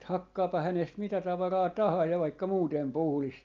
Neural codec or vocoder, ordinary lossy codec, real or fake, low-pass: none; Opus, 32 kbps; real; 7.2 kHz